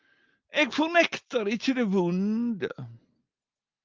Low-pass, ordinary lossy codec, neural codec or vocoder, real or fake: 7.2 kHz; Opus, 32 kbps; none; real